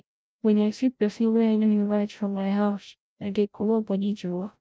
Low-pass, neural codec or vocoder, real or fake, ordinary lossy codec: none; codec, 16 kHz, 0.5 kbps, FreqCodec, larger model; fake; none